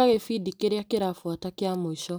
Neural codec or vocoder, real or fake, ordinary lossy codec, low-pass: none; real; none; none